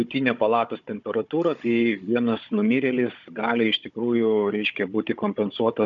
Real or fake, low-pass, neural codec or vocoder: fake; 7.2 kHz; codec, 16 kHz, 16 kbps, FunCodec, trained on Chinese and English, 50 frames a second